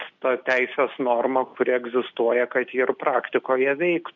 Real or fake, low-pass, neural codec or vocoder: real; 7.2 kHz; none